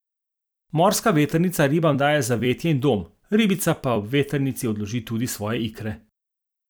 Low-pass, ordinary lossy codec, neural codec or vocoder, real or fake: none; none; vocoder, 44.1 kHz, 128 mel bands every 256 samples, BigVGAN v2; fake